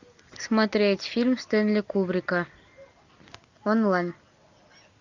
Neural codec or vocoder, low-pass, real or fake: none; 7.2 kHz; real